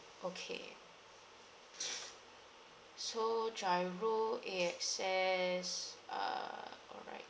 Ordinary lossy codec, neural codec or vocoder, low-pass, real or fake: none; none; none; real